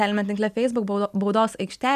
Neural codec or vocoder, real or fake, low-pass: none; real; 14.4 kHz